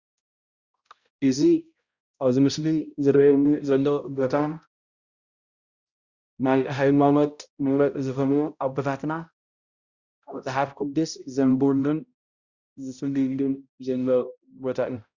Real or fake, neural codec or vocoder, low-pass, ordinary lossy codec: fake; codec, 16 kHz, 0.5 kbps, X-Codec, HuBERT features, trained on balanced general audio; 7.2 kHz; Opus, 64 kbps